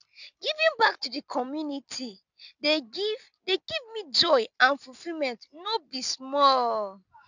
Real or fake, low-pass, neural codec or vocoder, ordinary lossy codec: real; 7.2 kHz; none; none